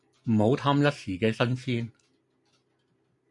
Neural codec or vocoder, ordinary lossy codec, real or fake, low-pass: none; MP3, 96 kbps; real; 10.8 kHz